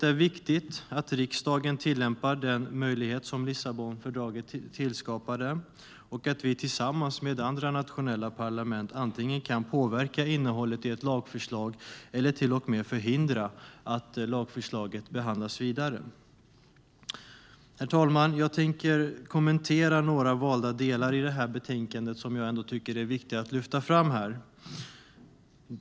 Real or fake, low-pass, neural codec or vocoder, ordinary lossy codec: real; none; none; none